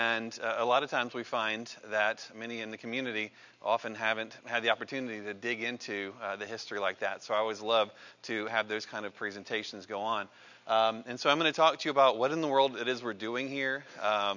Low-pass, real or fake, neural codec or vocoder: 7.2 kHz; real; none